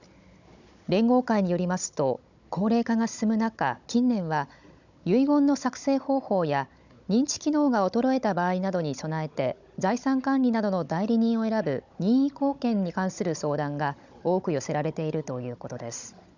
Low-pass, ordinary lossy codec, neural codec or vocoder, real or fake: 7.2 kHz; none; codec, 16 kHz, 16 kbps, FunCodec, trained on Chinese and English, 50 frames a second; fake